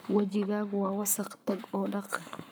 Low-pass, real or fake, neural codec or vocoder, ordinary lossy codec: none; fake; codec, 44.1 kHz, 7.8 kbps, Pupu-Codec; none